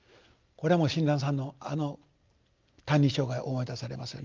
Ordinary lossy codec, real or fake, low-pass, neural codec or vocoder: Opus, 24 kbps; real; 7.2 kHz; none